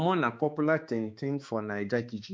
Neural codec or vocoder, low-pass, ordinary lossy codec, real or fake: codec, 16 kHz, 2 kbps, X-Codec, HuBERT features, trained on balanced general audio; none; none; fake